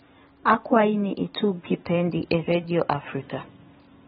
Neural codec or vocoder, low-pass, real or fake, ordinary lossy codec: vocoder, 44.1 kHz, 128 mel bands every 512 samples, BigVGAN v2; 19.8 kHz; fake; AAC, 16 kbps